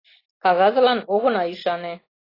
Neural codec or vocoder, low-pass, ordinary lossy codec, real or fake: none; 5.4 kHz; AAC, 24 kbps; real